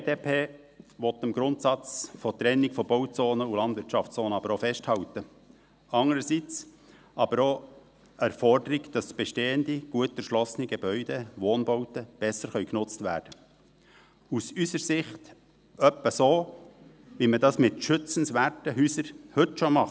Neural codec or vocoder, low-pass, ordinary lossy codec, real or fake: none; none; none; real